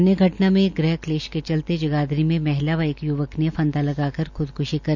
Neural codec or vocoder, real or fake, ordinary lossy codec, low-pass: none; real; none; 7.2 kHz